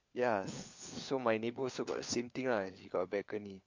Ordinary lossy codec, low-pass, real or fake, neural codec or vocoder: MP3, 48 kbps; 7.2 kHz; fake; codec, 16 kHz, 4 kbps, FunCodec, trained on LibriTTS, 50 frames a second